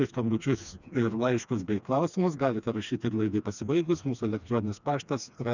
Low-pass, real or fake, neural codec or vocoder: 7.2 kHz; fake; codec, 16 kHz, 2 kbps, FreqCodec, smaller model